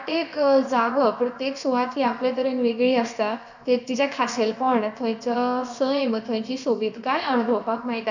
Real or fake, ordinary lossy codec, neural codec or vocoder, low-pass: fake; none; codec, 16 kHz, about 1 kbps, DyCAST, with the encoder's durations; 7.2 kHz